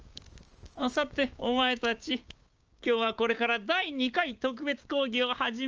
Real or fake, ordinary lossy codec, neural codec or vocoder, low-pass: fake; Opus, 24 kbps; codec, 16 kHz, 6 kbps, DAC; 7.2 kHz